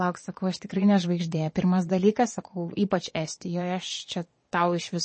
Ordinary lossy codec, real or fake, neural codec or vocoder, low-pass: MP3, 32 kbps; fake; vocoder, 22.05 kHz, 80 mel bands, Vocos; 9.9 kHz